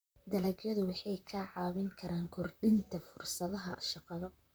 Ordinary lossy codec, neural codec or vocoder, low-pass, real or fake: none; vocoder, 44.1 kHz, 128 mel bands, Pupu-Vocoder; none; fake